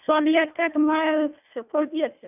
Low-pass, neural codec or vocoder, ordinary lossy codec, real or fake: 3.6 kHz; codec, 24 kHz, 1.5 kbps, HILCodec; none; fake